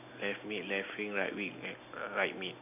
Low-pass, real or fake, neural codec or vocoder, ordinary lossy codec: 3.6 kHz; real; none; AAC, 32 kbps